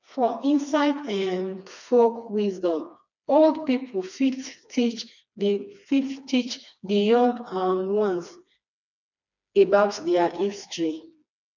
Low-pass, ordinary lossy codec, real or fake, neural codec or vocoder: 7.2 kHz; none; fake; codec, 16 kHz, 2 kbps, FreqCodec, smaller model